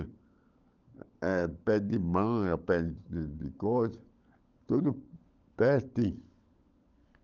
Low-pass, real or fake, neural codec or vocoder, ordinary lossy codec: 7.2 kHz; fake; codec, 16 kHz, 16 kbps, FunCodec, trained on LibriTTS, 50 frames a second; Opus, 24 kbps